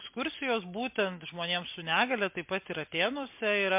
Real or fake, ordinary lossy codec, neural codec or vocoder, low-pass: real; MP3, 32 kbps; none; 3.6 kHz